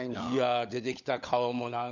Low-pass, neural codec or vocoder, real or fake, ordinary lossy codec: 7.2 kHz; codec, 16 kHz, 16 kbps, FunCodec, trained on LibriTTS, 50 frames a second; fake; none